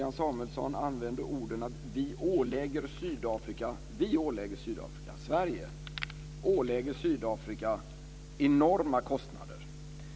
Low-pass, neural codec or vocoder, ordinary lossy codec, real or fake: none; none; none; real